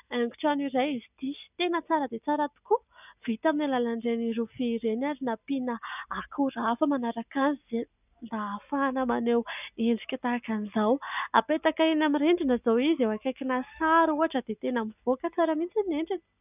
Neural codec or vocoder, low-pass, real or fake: none; 3.6 kHz; real